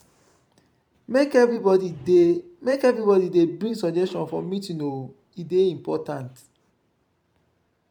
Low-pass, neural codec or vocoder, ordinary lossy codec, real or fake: 19.8 kHz; vocoder, 44.1 kHz, 128 mel bands every 512 samples, BigVGAN v2; none; fake